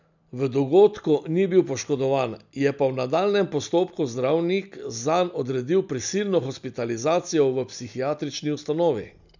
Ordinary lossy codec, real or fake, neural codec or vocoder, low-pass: none; real; none; 7.2 kHz